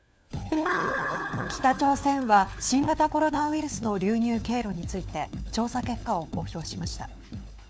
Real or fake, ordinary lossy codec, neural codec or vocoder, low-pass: fake; none; codec, 16 kHz, 4 kbps, FunCodec, trained on LibriTTS, 50 frames a second; none